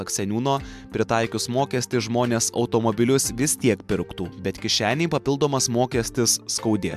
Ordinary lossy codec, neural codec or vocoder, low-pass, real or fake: MP3, 96 kbps; none; 14.4 kHz; real